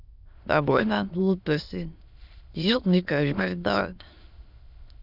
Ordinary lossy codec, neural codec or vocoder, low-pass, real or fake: none; autoencoder, 22.05 kHz, a latent of 192 numbers a frame, VITS, trained on many speakers; 5.4 kHz; fake